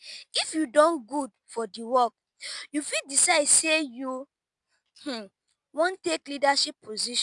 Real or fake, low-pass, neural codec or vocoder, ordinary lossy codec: real; 10.8 kHz; none; none